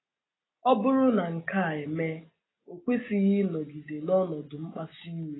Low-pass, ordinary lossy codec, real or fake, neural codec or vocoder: 7.2 kHz; AAC, 16 kbps; real; none